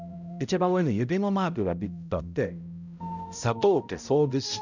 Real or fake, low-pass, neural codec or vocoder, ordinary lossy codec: fake; 7.2 kHz; codec, 16 kHz, 0.5 kbps, X-Codec, HuBERT features, trained on balanced general audio; none